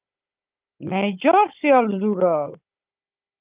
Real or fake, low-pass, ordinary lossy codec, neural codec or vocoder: fake; 3.6 kHz; Opus, 24 kbps; codec, 16 kHz, 16 kbps, FunCodec, trained on Chinese and English, 50 frames a second